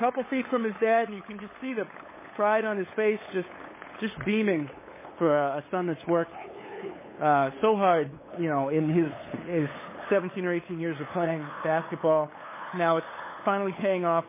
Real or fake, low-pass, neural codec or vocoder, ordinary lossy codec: fake; 3.6 kHz; codec, 16 kHz, 8 kbps, FunCodec, trained on LibriTTS, 25 frames a second; MP3, 16 kbps